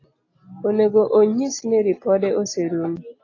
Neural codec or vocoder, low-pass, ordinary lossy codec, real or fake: none; 7.2 kHz; AAC, 32 kbps; real